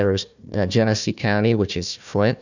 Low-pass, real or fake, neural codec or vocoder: 7.2 kHz; fake; codec, 16 kHz, 1 kbps, FunCodec, trained on Chinese and English, 50 frames a second